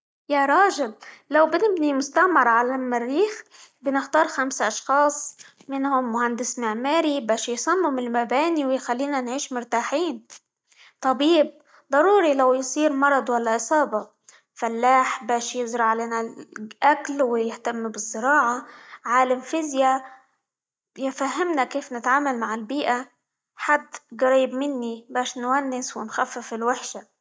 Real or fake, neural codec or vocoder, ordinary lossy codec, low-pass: real; none; none; none